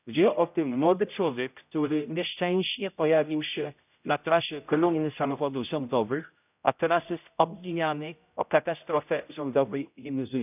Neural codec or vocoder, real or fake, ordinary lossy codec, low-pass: codec, 16 kHz, 0.5 kbps, X-Codec, HuBERT features, trained on general audio; fake; none; 3.6 kHz